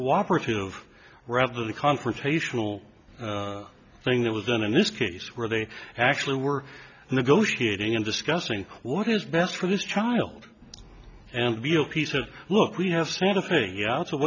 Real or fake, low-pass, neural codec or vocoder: real; 7.2 kHz; none